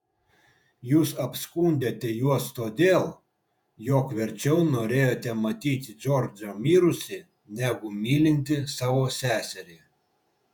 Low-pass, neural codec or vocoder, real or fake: 19.8 kHz; vocoder, 48 kHz, 128 mel bands, Vocos; fake